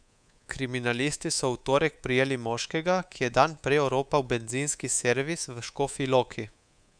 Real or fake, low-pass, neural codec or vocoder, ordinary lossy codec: fake; 9.9 kHz; codec, 24 kHz, 3.1 kbps, DualCodec; none